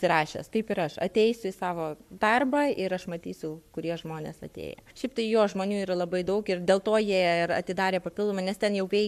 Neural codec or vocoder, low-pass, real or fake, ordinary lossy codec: codec, 44.1 kHz, 7.8 kbps, Pupu-Codec; 14.4 kHz; fake; MP3, 96 kbps